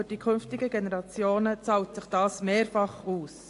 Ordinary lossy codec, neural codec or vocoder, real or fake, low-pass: AAC, 48 kbps; none; real; 10.8 kHz